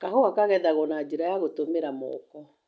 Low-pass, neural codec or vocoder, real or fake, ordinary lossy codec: none; none; real; none